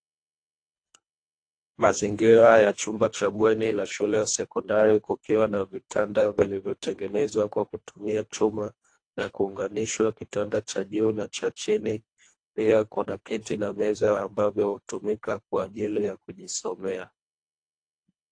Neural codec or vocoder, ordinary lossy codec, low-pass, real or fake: codec, 24 kHz, 1.5 kbps, HILCodec; AAC, 48 kbps; 9.9 kHz; fake